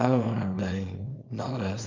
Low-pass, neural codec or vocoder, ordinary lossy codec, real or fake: 7.2 kHz; codec, 24 kHz, 0.9 kbps, WavTokenizer, small release; MP3, 64 kbps; fake